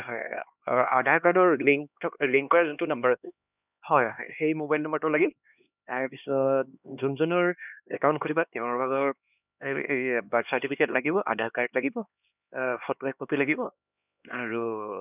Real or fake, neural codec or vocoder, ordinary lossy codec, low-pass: fake; codec, 16 kHz, 2 kbps, X-Codec, HuBERT features, trained on LibriSpeech; none; 3.6 kHz